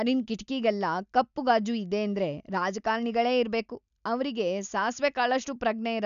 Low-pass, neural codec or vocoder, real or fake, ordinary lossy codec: 7.2 kHz; none; real; none